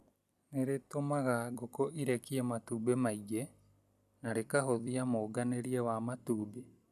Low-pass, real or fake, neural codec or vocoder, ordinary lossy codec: 14.4 kHz; fake; vocoder, 44.1 kHz, 128 mel bands every 256 samples, BigVGAN v2; none